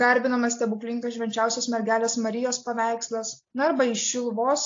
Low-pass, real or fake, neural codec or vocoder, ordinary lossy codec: 7.2 kHz; real; none; AAC, 48 kbps